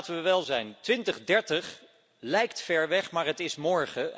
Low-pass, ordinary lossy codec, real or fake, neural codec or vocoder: none; none; real; none